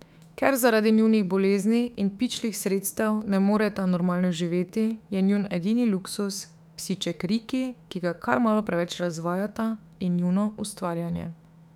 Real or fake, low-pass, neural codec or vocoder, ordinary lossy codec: fake; 19.8 kHz; autoencoder, 48 kHz, 32 numbers a frame, DAC-VAE, trained on Japanese speech; none